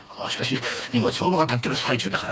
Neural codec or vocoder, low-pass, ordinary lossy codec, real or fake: codec, 16 kHz, 2 kbps, FreqCodec, smaller model; none; none; fake